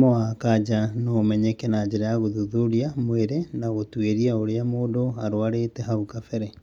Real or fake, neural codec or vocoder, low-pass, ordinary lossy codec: real; none; 19.8 kHz; none